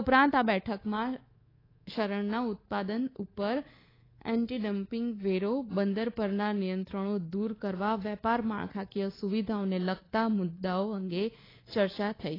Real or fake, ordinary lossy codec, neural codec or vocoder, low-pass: fake; AAC, 24 kbps; codec, 24 kHz, 3.1 kbps, DualCodec; 5.4 kHz